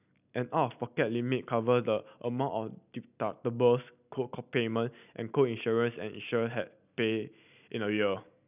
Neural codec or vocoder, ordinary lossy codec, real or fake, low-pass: none; none; real; 3.6 kHz